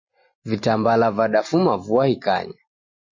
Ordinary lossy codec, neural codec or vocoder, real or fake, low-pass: MP3, 32 kbps; none; real; 7.2 kHz